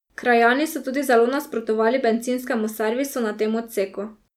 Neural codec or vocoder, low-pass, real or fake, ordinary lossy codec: none; 19.8 kHz; real; none